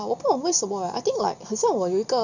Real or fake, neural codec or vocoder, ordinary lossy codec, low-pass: real; none; none; 7.2 kHz